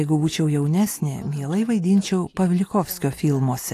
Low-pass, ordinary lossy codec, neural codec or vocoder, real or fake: 14.4 kHz; AAC, 64 kbps; none; real